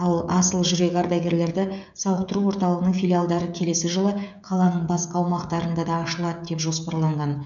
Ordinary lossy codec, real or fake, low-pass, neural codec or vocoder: none; fake; 7.2 kHz; codec, 16 kHz, 16 kbps, FreqCodec, smaller model